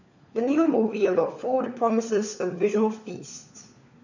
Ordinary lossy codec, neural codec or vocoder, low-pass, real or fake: none; codec, 16 kHz, 4 kbps, FunCodec, trained on LibriTTS, 50 frames a second; 7.2 kHz; fake